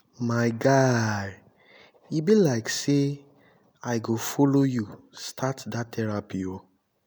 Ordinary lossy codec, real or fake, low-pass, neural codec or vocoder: none; real; none; none